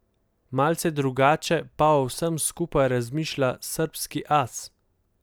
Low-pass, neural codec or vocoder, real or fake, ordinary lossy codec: none; none; real; none